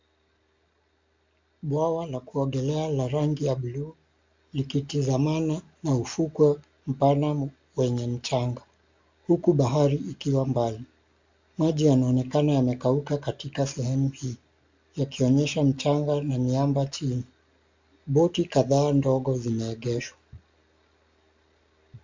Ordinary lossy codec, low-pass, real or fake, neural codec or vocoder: MP3, 64 kbps; 7.2 kHz; real; none